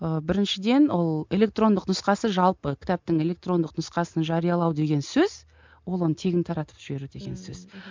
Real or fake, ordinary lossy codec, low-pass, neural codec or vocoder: real; MP3, 64 kbps; 7.2 kHz; none